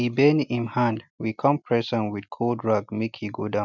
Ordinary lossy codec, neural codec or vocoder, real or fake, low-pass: none; none; real; 7.2 kHz